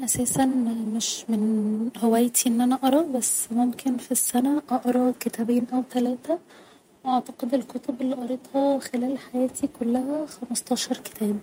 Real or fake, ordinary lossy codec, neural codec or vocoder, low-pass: fake; MP3, 64 kbps; vocoder, 48 kHz, 128 mel bands, Vocos; 19.8 kHz